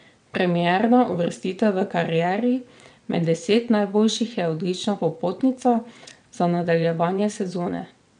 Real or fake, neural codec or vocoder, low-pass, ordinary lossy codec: fake; vocoder, 22.05 kHz, 80 mel bands, WaveNeXt; 9.9 kHz; none